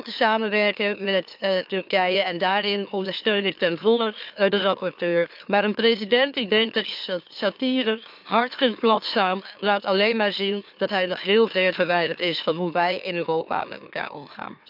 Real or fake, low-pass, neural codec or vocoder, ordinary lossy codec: fake; 5.4 kHz; autoencoder, 44.1 kHz, a latent of 192 numbers a frame, MeloTTS; AAC, 48 kbps